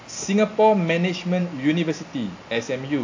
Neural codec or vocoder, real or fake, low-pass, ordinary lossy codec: none; real; 7.2 kHz; AAC, 48 kbps